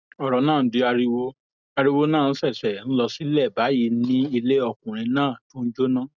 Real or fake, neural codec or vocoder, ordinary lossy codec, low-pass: real; none; none; 7.2 kHz